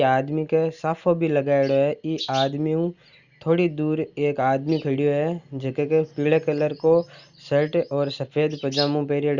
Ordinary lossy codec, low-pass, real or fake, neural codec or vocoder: Opus, 64 kbps; 7.2 kHz; real; none